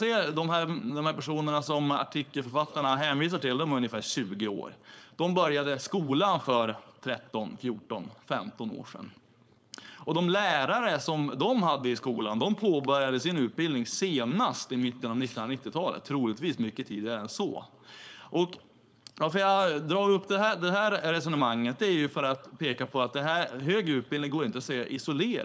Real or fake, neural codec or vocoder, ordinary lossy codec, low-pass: fake; codec, 16 kHz, 4.8 kbps, FACodec; none; none